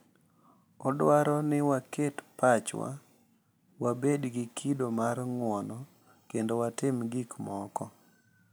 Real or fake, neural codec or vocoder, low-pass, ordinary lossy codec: real; none; none; none